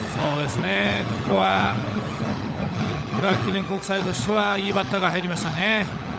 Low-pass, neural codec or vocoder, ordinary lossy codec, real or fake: none; codec, 16 kHz, 16 kbps, FunCodec, trained on LibriTTS, 50 frames a second; none; fake